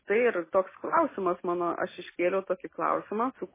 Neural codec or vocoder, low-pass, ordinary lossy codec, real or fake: none; 3.6 kHz; MP3, 16 kbps; real